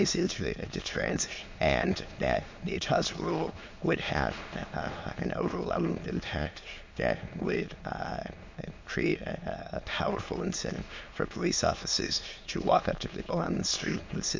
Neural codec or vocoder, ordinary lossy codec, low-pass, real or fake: autoencoder, 22.05 kHz, a latent of 192 numbers a frame, VITS, trained on many speakers; MP3, 48 kbps; 7.2 kHz; fake